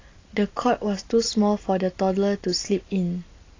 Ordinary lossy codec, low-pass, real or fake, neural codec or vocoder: AAC, 32 kbps; 7.2 kHz; real; none